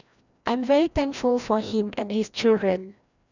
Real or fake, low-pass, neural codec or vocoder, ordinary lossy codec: fake; 7.2 kHz; codec, 16 kHz, 1 kbps, FreqCodec, larger model; none